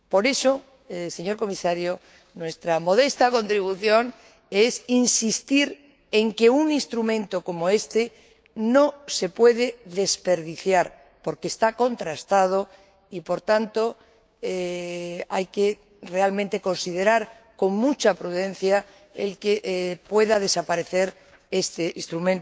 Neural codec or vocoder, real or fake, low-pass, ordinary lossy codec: codec, 16 kHz, 6 kbps, DAC; fake; none; none